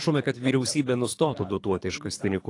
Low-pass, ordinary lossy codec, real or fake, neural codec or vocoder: 10.8 kHz; AAC, 48 kbps; fake; codec, 24 kHz, 3 kbps, HILCodec